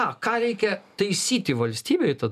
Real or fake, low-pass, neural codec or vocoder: real; 14.4 kHz; none